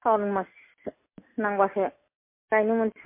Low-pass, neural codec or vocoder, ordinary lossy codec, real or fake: 3.6 kHz; none; MP3, 24 kbps; real